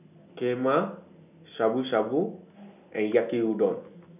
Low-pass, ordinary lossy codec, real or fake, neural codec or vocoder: 3.6 kHz; none; real; none